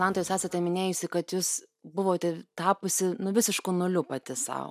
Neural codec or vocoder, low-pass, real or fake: none; 14.4 kHz; real